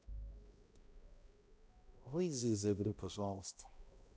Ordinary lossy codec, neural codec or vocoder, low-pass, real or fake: none; codec, 16 kHz, 0.5 kbps, X-Codec, HuBERT features, trained on balanced general audio; none; fake